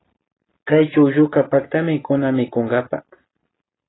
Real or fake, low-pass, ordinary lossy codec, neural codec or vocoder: real; 7.2 kHz; AAC, 16 kbps; none